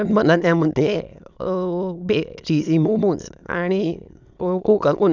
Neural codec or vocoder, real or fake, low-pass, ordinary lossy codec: autoencoder, 22.05 kHz, a latent of 192 numbers a frame, VITS, trained on many speakers; fake; 7.2 kHz; none